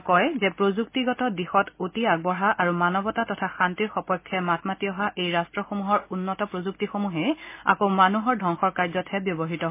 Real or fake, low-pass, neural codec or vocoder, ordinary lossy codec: real; 3.6 kHz; none; MP3, 24 kbps